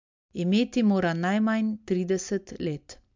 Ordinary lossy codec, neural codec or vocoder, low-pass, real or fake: MP3, 64 kbps; none; 7.2 kHz; real